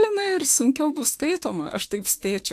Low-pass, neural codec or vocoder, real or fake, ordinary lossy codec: 14.4 kHz; autoencoder, 48 kHz, 32 numbers a frame, DAC-VAE, trained on Japanese speech; fake; AAC, 64 kbps